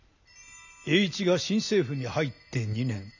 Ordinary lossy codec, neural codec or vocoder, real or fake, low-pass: none; none; real; 7.2 kHz